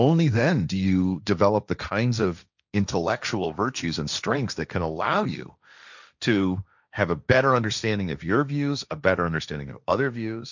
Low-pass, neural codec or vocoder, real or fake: 7.2 kHz; codec, 16 kHz, 1.1 kbps, Voila-Tokenizer; fake